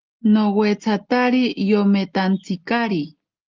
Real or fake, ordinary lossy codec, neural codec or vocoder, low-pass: real; Opus, 24 kbps; none; 7.2 kHz